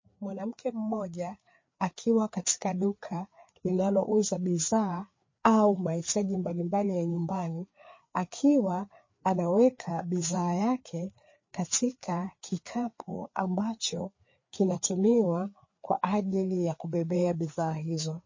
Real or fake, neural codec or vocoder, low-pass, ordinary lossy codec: fake; codec, 16 kHz, 4 kbps, FreqCodec, larger model; 7.2 kHz; MP3, 32 kbps